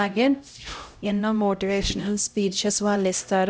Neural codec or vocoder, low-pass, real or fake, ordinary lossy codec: codec, 16 kHz, 0.5 kbps, X-Codec, HuBERT features, trained on LibriSpeech; none; fake; none